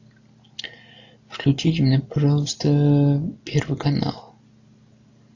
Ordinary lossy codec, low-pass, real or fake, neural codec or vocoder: AAC, 48 kbps; 7.2 kHz; real; none